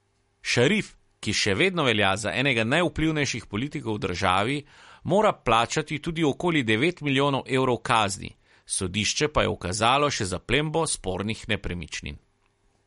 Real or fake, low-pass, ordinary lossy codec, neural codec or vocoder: real; 19.8 kHz; MP3, 48 kbps; none